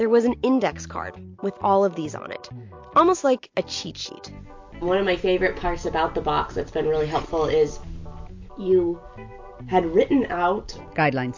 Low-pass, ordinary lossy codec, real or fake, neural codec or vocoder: 7.2 kHz; MP3, 48 kbps; real; none